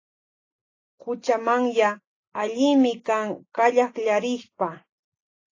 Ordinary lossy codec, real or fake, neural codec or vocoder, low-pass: AAC, 32 kbps; real; none; 7.2 kHz